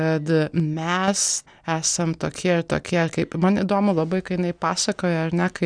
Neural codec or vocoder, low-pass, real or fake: none; 9.9 kHz; real